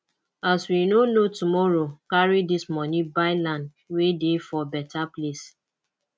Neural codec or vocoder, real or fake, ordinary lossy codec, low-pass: none; real; none; none